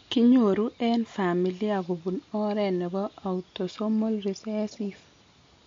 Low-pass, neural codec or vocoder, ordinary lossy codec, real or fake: 7.2 kHz; none; MP3, 48 kbps; real